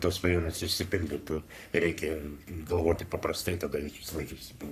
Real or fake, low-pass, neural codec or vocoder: fake; 14.4 kHz; codec, 44.1 kHz, 3.4 kbps, Pupu-Codec